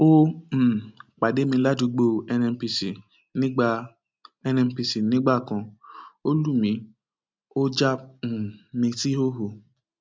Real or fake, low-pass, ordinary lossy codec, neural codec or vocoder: real; none; none; none